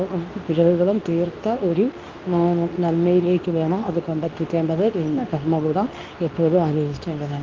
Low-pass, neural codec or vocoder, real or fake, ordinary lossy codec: 7.2 kHz; codec, 24 kHz, 0.9 kbps, WavTokenizer, medium speech release version 2; fake; Opus, 24 kbps